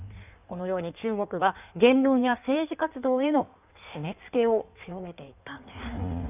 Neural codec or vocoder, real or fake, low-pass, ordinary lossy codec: codec, 16 kHz in and 24 kHz out, 1.1 kbps, FireRedTTS-2 codec; fake; 3.6 kHz; none